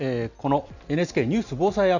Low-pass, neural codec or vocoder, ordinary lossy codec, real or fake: 7.2 kHz; none; none; real